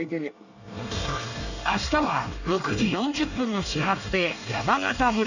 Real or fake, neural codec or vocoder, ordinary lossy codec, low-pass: fake; codec, 24 kHz, 1 kbps, SNAC; none; 7.2 kHz